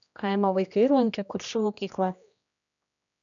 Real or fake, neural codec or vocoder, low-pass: fake; codec, 16 kHz, 1 kbps, X-Codec, HuBERT features, trained on general audio; 7.2 kHz